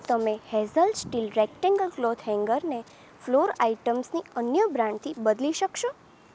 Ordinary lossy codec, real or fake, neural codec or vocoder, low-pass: none; real; none; none